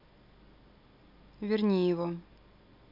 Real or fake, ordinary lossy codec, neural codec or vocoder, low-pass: real; MP3, 48 kbps; none; 5.4 kHz